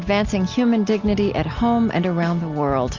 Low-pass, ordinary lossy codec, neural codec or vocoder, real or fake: 7.2 kHz; Opus, 32 kbps; none; real